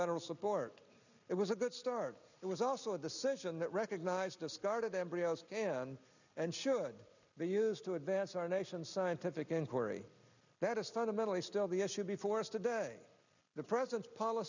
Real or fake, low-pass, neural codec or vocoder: real; 7.2 kHz; none